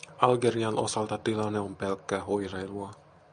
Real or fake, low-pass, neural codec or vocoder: real; 9.9 kHz; none